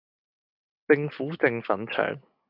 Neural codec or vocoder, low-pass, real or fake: none; 5.4 kHz; real